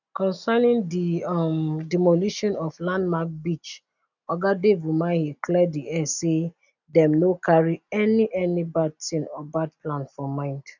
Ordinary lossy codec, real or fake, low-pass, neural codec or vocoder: none; real; 7.2 kHz; none